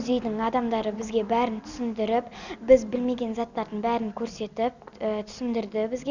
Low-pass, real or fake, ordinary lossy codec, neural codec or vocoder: 7.2 kHz; real; none; none